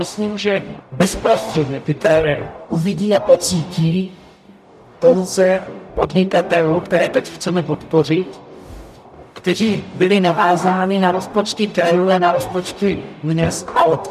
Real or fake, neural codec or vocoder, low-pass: fake; codec, 44.1 kHz, 0.9 kbps, DAC; 14.4 kHz